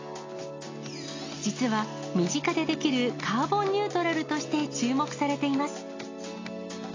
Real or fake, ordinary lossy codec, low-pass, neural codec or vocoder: real; AAC, 32 kbps; 7.2 kHz; none